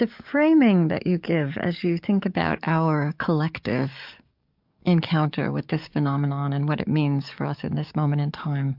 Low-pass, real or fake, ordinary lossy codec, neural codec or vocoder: 5.4 kHz; fake; MP3, 48 kbps; codec, 44.1 kHz, 7.8 kbps, DAC